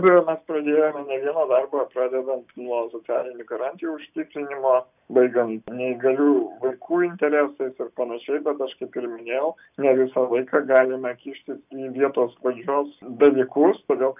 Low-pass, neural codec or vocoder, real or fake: 3.6 kHz; none; real